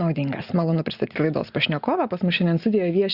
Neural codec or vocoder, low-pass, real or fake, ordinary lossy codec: none; 5.4 kHz; real; Opus, 64 kbps